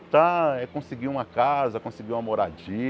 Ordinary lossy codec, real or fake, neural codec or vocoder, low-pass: none; real; none; none